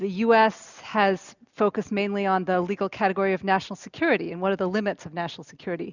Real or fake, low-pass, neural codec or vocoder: real; 7.2 kHz; none